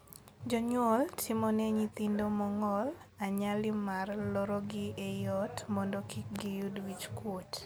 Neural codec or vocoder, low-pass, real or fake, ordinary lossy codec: none; none; real; none